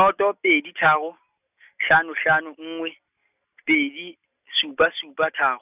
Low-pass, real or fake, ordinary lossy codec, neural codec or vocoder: 3.6 kHz; real; none; none